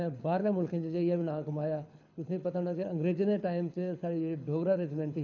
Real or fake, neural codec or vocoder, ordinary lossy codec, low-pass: fake; codec, 24 kHz, 6 kbps, HILCodec; none; 7.2 kHz